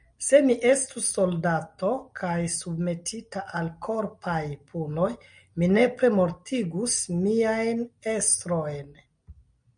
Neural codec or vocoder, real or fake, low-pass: none; real; 9.9 kHz